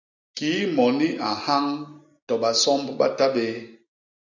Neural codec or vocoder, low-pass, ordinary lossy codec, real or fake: none; 7.2 kHz; AAC, 48 kbps; real